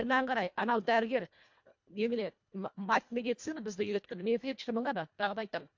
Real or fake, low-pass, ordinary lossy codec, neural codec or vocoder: fake; 7.2 kHz; MP3, 48 kbps; codec, 24 kHz, 1.5 kbps, HILCodec